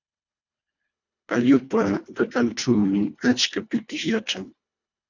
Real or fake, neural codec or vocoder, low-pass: fake; codec, 24 kHz, 1.5 kbps, HILCodec; 7.2 kHz